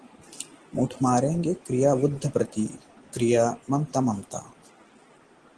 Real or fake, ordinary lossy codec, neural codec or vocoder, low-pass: real; Opus, 16 kbps; none; 10.8 kHz